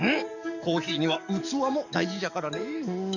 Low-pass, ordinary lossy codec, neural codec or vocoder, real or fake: 7.2 kHz; none; codec, 44.1 kHz, 7.8 kbps, DAC; fake